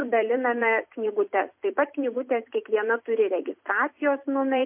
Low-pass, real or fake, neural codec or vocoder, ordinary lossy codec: 3.6 kHz; fake; vocoder, 44.1 kHz, 128 mel bands every 256 samples, BigVGAN v2; MP3, 32 kbps